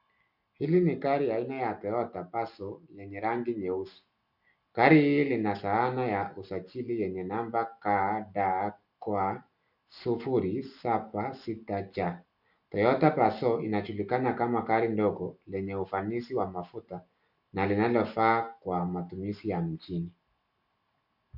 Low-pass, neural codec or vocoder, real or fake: 5.4 kHz; none; real